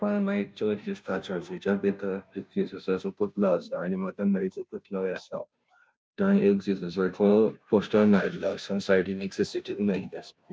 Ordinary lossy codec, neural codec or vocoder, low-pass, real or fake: none; codec, 16 kHz, 0.5 kbps, FunCodec, trained on Chinese and English, 25 frames a second; none; fake